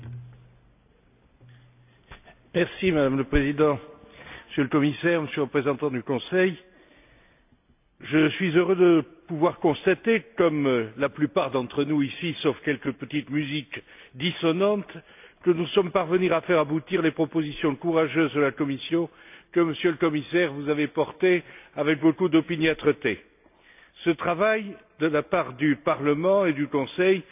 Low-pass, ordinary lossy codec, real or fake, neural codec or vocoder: 3.6 kHz; AAC, 32 kbps; real; none